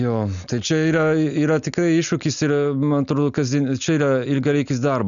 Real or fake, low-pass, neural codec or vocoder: real; 7.2 kHz; none